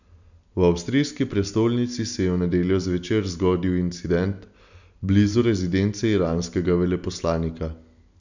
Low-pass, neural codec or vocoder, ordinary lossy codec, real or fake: 7.2 kHz; none; none; real